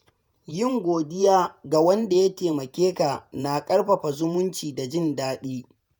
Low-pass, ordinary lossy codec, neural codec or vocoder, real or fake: none; none; vocoder, 48 kHz, 128 mel bands, Vocos; fake